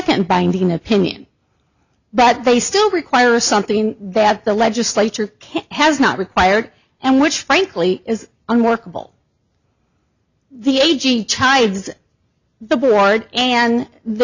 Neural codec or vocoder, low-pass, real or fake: none; 7.2 kHz; real